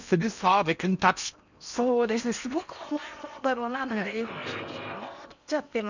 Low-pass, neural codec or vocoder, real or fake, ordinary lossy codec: 7.2 kHz; codec, 16 kHz in and 24 kHz out, 0.8 kbps, FocalCodec, streaming, 65536 codes; fake; none